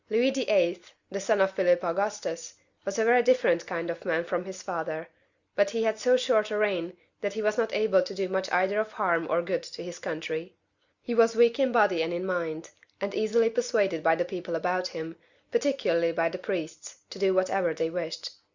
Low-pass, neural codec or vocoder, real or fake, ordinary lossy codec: 7.2 kHz; none; real; Opus, 64 kbps